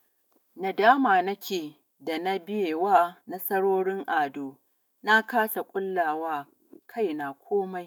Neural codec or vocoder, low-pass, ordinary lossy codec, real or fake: autoencoder, 48 kHz, 128 numbers a frame, DAC-VAE, trained on Japanese speech; none; none; fake